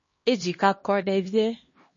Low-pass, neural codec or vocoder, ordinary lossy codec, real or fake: 7.2 kHz; codec, 16 kHz, 1 kbps, X-Codec, HuBERT features, trained on LibriSpeech; MP3, 32 kbps; fake